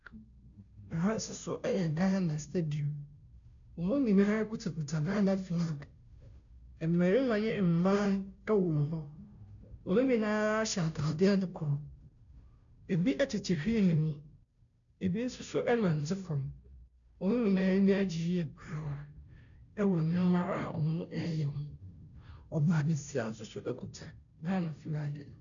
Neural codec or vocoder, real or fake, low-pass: codec, 16 kHz, 0.5 kbps, FunCodec, trained on Chinese and English, 25 frames a second; fake; 7.2 kHz